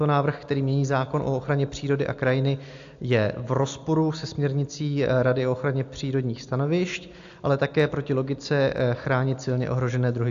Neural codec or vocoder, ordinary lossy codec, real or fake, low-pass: none; AAC, 64 kbps; real; 7.2 kHz